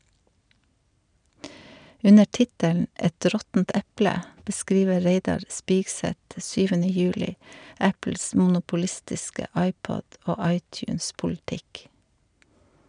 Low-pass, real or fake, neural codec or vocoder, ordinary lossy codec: 9.9 kHz; real; none; none